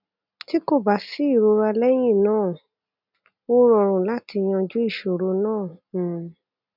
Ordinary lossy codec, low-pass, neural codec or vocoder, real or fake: none; 5.4 kHz; none; real